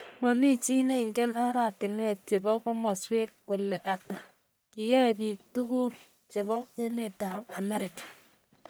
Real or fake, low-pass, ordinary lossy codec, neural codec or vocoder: fake; none; none; codec, 44.1 kHz, 1.7 kbps, Pupu-Codec